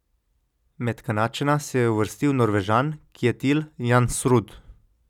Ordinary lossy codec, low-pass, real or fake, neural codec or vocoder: none; 19.8 kHz; real; none